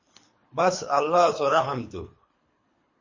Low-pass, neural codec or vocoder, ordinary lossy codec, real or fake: 7.2 kHz; codec, 24 kHz, 3 kbps, HILCodec; MP3, 32 kbps; fake